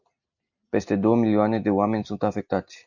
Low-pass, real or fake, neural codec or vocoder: 7.2 kHz; real; none